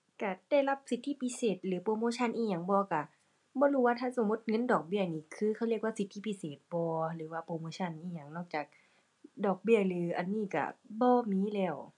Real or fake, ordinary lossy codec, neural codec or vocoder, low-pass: real; none; none; 10.8 kHz